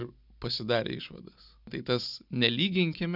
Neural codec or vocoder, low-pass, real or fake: none; 5.4 kHz; real